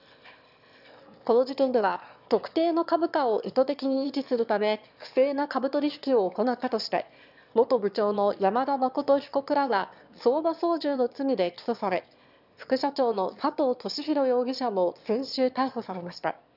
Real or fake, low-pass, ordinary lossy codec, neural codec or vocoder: fake; 5.4 kHz; none; autoencoder, 22.05 kHz, a latent of 192 numbers a frame, VITS, trained on one speaker